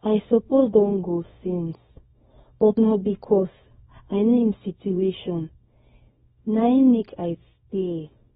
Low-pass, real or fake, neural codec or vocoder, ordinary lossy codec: 10.8 kHz; fake; codec, 24 kHz, 0.9 kbps, WavTokenizer, small release; AAC, 16 kbps